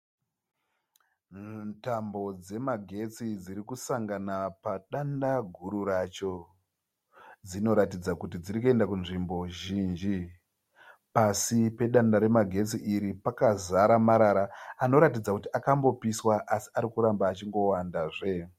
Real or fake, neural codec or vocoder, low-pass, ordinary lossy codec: real; none; 19.8 kHz; MP3, 64 kbps